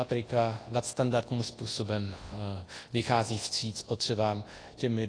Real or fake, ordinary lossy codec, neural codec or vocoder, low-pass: fake; AAC, 32 kbps; codec, 24 kHz, 0.9 kbps, WavTokenizer, large speech release; 9.9 kHz